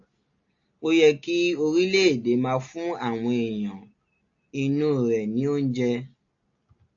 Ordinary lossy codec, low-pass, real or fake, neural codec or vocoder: AAC, 48 kbps; 7.2 kHz; real; none